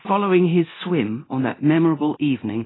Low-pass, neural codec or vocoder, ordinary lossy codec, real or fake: 7.2 kHz; codec, 24 kHz, 0.9 kbps, DualCodec; AAC, 16 kbps; fake